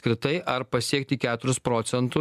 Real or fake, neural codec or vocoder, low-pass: real; none; 14.4 kHz